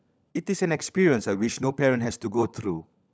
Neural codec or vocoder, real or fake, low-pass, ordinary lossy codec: codec, 16 kHz, 16 kbps, FunCodec, trained on LibriTTS, 50 frames a second; fake; none; none